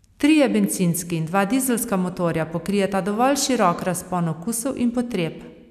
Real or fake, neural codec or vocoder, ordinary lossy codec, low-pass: real; none; none; 14.4 kHz